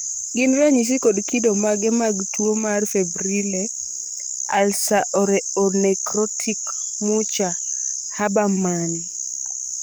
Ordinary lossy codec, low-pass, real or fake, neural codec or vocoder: none; none; fake; codec, 44.1 kHz, 7.8 kbps, DAC